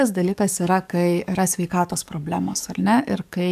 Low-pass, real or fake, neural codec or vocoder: 14.4 kHz; fake; codec, 44.1 kHz, 7.8 kbps, DAC